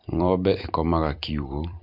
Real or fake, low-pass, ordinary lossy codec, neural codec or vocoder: fake; 5.4 kHz; MP3, 48 kbps; vocoder, 44.1 kHz, 128 mel bands every 256 samples, BigVGAN v2